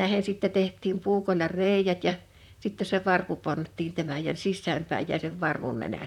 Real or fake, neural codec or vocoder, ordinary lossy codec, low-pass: fake; vocoder, 44.1 kHz, 128 mel bands, Pupu-Vocoder; none; 19.8 kHz